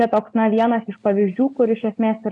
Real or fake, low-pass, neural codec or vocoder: real; 10.8 kHz; none